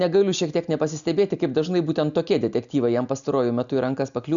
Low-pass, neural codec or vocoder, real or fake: 7.2 kHz; none; real